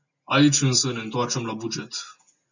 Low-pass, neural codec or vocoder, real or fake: 7.2 kHz; none; real